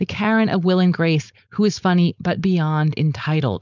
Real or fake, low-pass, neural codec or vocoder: fake; 7.2 kHz; codec, 16 kHz, 4.8 kbps, FACodec